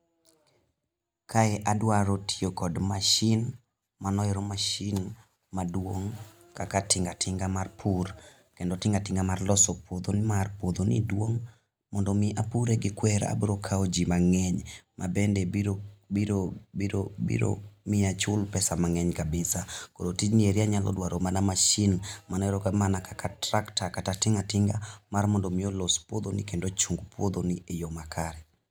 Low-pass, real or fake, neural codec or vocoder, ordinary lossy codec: none; real; none; none